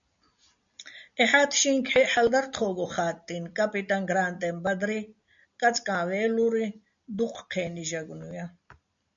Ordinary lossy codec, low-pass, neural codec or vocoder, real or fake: MP3, 64 kbps; 7.2 kHz; none; real